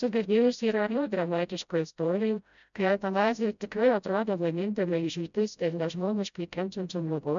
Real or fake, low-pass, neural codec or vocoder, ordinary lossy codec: fake; 7.2 kHz; codec, 16 kHz, 0.5 kbps, FreqCodec, smaller model; MP3, 96 kbps